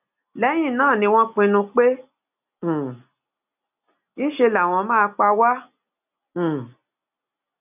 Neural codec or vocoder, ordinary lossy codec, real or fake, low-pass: none; none; real; 3.6 kHz